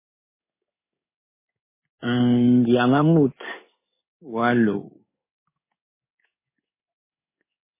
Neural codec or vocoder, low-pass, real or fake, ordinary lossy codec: codec, 16 kHz in and 24 kHz out, 1 kbps, XY-Tokenizer; 3.6 kHz; fake; MP3, 16 kbps